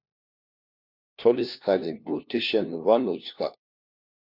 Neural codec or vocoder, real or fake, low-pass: codec, 16 kHz, 1 kbps, FunCodec, trained on LibriTTS, 50 frames a second; fake; 5.4 kHz